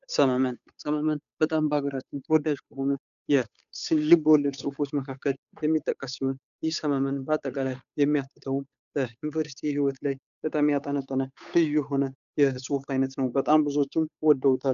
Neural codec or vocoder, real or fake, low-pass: codec, 16 kHz, 8 kbps, FunCodec, trained on Chinese and English, 25 frames a second; fake; 7.2 kHz